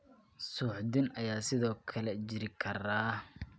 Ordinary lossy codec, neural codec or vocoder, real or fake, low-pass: none; none; real; none